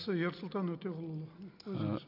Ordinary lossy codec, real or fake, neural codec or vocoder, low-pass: none; real; none; 5.4 kHz